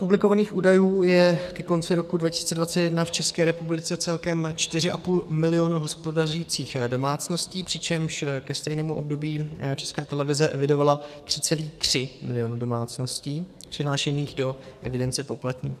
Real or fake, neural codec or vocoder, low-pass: fake; codec, 44.1 kHz, 2.6 kbps, SNAC; 14.4 kHz